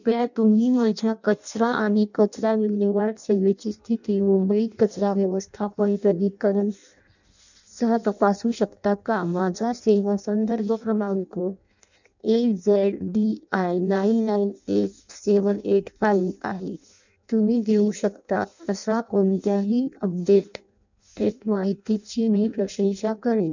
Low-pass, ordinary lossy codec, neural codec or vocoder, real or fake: 7.2 kHz; none; codec, 16 kHz in and 24 kHz out, 0.6 kbps, FireRedTTS-2 codec; fake